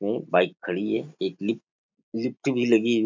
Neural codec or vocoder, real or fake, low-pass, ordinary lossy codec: none; real; 7.2 kHz; MP3, 64 kbps